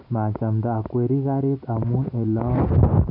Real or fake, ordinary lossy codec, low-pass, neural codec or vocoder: real; none; 5.4 kHz; none